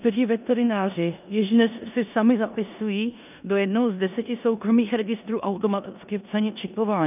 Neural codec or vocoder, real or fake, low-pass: codec, 16 kHz in and 24 kHz out, 0.9 kbps, LongCat-Audio-Codec, four codebook decoder; fake; 3.6 kHz